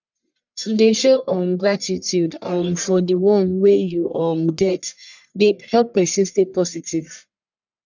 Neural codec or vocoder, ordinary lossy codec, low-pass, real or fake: codec, 44.1 kHz, 1.7 kbps, Pupu-Codec; none; 7.2 kHz; fake